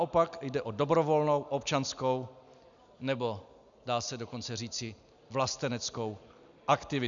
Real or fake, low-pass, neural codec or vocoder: real; 7.2 kHz; none